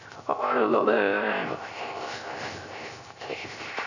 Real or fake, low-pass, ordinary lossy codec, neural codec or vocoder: fake; 7.2 kHz; none; codec, 16 kHz, 0.3 kbps, FocalCodec